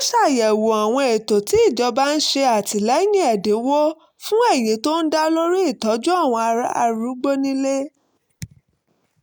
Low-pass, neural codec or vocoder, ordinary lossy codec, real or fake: none; none; none; real